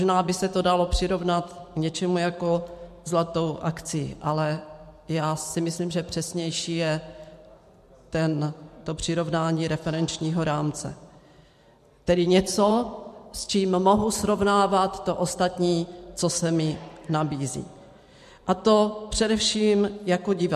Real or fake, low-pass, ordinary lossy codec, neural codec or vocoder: fake; 14.4 kHz; MP3, 64 kbps; vocoder, 44.1 kHz, 128 mel bands every 512 samples, BigVGAN v2